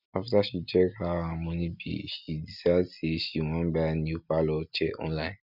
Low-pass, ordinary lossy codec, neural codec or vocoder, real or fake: 5.4 kHz; none; none; real